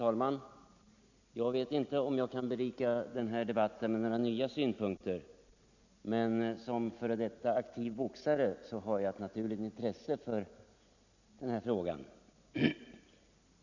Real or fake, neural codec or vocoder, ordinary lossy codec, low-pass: real; none; none; 7.2 kHz